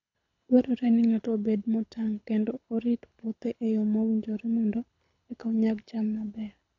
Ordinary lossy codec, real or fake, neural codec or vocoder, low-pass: none; fake; codec, 24 kHz, 6 kbps, HILCodec; 7.2 kHz